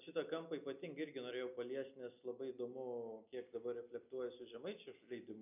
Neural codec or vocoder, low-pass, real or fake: none; 3.6 kHz; real